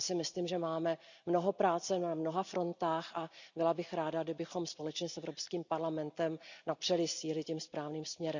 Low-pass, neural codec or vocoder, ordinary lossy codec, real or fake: 7.2 kHz; none; none; real